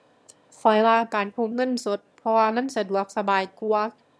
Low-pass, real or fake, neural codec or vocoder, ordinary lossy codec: none; fake; autoencoder, 22.05 kHz, a latent of 192 numbers a frame, VITS, trained on one speaker; none